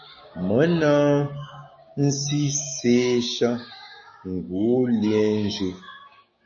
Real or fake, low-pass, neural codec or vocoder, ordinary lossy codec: fake; 7.2 kHz; codec, 16 kHz, 6 kbps, DAC; MP3, 32 kbps